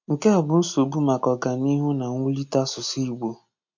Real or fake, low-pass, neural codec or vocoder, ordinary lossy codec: real; 7.2 kHz; none; MP3, 48 kbps